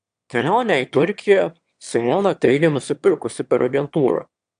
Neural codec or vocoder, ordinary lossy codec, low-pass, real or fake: autoencoder, 22.05 kHz, a latent of 192 numbers a frame, VITS, trained on one speaker; AAC, 96 kbps; 9.9 kHz; fake